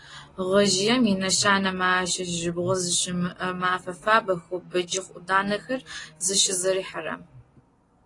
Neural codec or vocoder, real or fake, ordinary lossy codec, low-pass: none; real; AAC, 32 kbps; 10.8 kHz